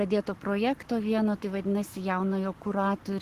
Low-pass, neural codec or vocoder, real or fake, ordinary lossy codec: 14.4 kHz; codec, 44.1 kHz, 7.8 kbps, Pupu-Codec; fake; Opus, 16 kbps